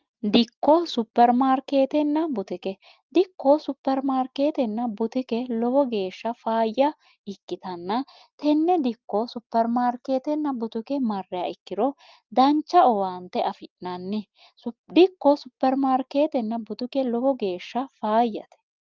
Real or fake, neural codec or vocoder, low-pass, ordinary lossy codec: real; none; 7.2 kHz; Opus, 24 kbps